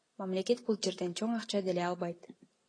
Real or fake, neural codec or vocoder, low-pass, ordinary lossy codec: real; none; 9.9 kHz; AAC, 32 kbps